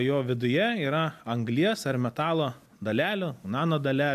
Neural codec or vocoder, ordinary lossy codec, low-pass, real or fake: none; AAC, 96 kbps; 14.4 kHz; real